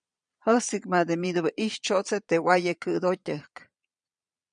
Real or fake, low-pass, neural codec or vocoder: fake; 9.9 kHz; vocoder, 22.05 kHz, 80 mel bands, Vocos